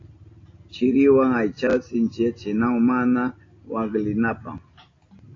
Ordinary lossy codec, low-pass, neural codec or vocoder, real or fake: AAC, 32 kbps; 7.2 kHz; none; real